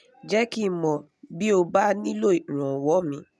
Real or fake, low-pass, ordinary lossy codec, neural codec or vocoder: real; none; none; none